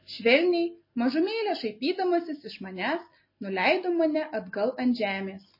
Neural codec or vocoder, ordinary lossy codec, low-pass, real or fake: none; MP3, 24 kbps; 5.4 kHz; real